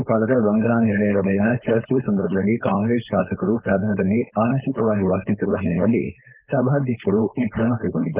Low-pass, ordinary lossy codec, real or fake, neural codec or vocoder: 3.6 kHz; Opus, 24 kbps; fake; codec, 16 kHz, 4.8 kbps, FACodec